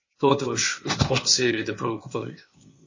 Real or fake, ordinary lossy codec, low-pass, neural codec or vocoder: fake; MP3, 32 kbps; 7.2 kHz; codec, 16 kHz, 0.8 kbps, ZipCodec